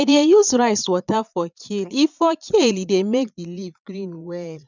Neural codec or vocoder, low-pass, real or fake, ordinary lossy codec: vocoder, 22.05 kHz, 80 mel bands, Vocos; 7.2 kHz; fake; none